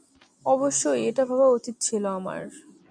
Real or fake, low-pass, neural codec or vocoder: real; 9.9 kHz; none